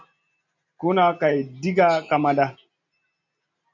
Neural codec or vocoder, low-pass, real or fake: none; 7.2 kHz; real